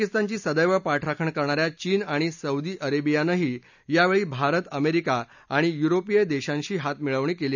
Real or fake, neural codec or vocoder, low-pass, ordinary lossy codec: real; none; 7.2 kHz; none